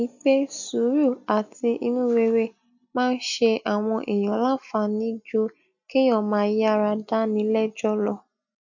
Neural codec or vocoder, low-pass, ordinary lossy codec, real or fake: none; 7.2 kHz; none; real